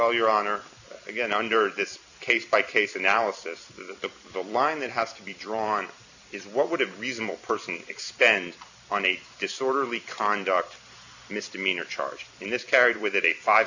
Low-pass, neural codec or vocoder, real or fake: 7.2 kHz; vocoder, 44.1 kHz, 128 mel bands every 256 samples, BigVGAN v2; fake